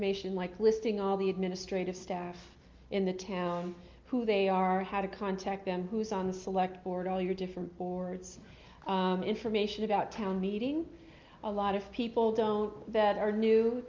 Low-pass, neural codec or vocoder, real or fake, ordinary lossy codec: 7.2 kHz; none; real; Opus, 24 kbps